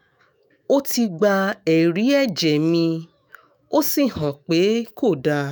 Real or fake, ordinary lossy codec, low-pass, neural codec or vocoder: fake; none; none; autoencoder, 48 kHz, 128 numbers a frame, DAC-VAE, trained on Japanese speech